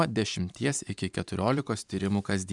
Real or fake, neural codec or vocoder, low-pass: fake; vocoder, 44.1 kHz, 128 mel bands every 512 samples, BigVGAN v2; 10.8 kHz